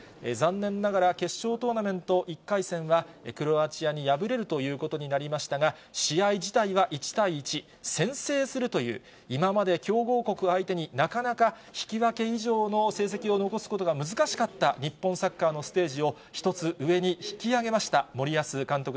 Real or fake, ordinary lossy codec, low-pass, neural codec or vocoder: real; none; none; none